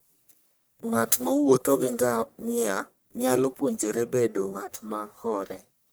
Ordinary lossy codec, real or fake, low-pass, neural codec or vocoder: none; fake; none; codec, 44.1 kHz, 1.7 kbps, Pupu-Codec